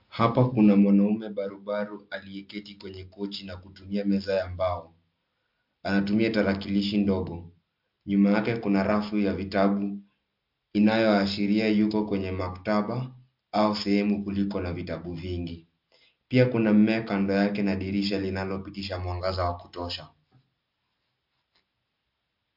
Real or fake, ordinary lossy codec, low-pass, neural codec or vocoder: real; MP3, 48 kbps; 5.4 kHz; none